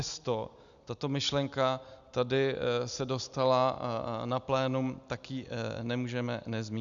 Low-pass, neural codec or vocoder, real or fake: 7.2 kHz; none; real